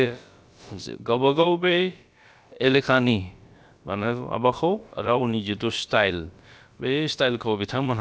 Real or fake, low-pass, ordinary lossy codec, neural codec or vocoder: fake; none; none; codec, 16 kHz, about 1 kbps, DyCAST, with the encoder's durations